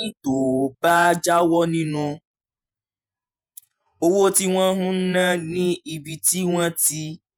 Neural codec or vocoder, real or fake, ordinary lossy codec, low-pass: vocoder, 48 kHz, 128 mel bands, Vocos; fake; none; none